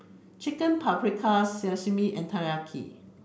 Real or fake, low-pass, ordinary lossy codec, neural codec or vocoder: real; none; none; none